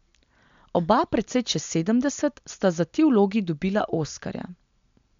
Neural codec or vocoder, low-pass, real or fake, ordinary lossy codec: none; 7.2 kHz; real; MP3, 64 kbps